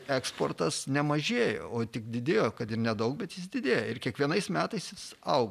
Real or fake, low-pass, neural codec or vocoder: real; 14.4 kHz; none